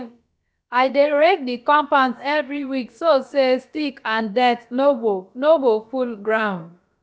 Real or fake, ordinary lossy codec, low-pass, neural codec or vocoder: fake; none; none; codec, 16 kHz, about 1 kbps, DyCAST, with the encoder's durations